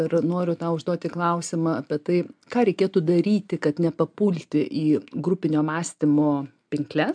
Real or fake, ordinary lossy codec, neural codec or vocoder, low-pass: real; MP3, 96 kbps; none; 9.9 kHz